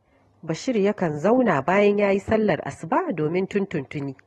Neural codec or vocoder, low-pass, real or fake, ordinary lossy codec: none; 19.8 kHz; real; AAC, 32 kbps